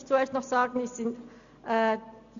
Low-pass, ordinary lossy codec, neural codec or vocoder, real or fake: 7.2 kHz; AAC, 96 kbps; none; real